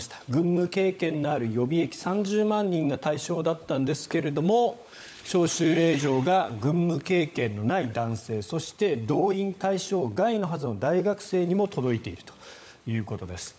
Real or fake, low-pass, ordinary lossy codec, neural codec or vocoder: fake; none; none; codec, 16 kHz, 16 kbps, FunCodec, trained on LibriTTS, 50 frames a second